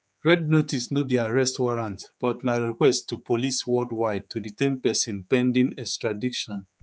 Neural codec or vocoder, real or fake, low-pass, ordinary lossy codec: codec, 16 kHz, 4 kbps, X-Codec, HuBERT features, trained on general audio; fake; none; none